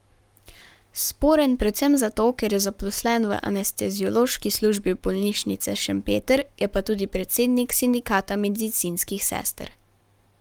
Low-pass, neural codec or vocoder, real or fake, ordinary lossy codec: 19.8 kHz; codec, 44.1 kHz, 7.8 kbps, Pupu-Codec; fake; Opus, 32 kbps